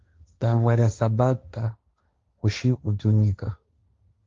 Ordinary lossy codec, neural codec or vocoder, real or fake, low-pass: Opus, 32 kbps; codec, 16 kHz, 1.1 kbps, Voila-Tokenizer; fake; 7.2 kHz